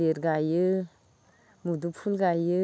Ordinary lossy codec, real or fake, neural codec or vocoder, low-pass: none; real; none; none